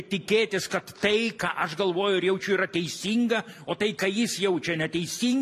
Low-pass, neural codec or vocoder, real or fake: 14.4 kHz; none; real